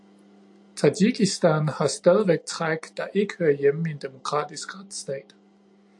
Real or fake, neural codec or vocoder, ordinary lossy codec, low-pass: real; none; AAC, 64 kbps; 10.8 kHz